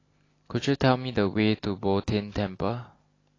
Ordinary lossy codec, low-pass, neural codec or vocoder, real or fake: AAC, 32 kbps; 7.2 kHz; none; real